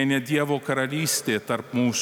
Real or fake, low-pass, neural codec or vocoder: real; 19.8 kHz; none